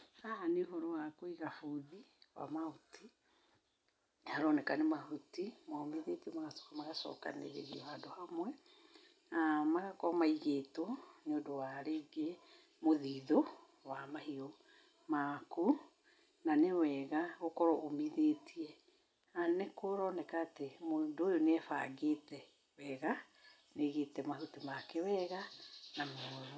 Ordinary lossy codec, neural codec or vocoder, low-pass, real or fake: none; none; none; real